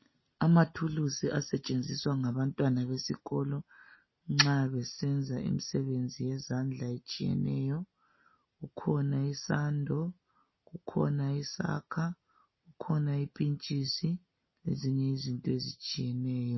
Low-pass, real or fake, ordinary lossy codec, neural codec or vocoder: 7.2 kHz; real; MP3, 24 kbps; none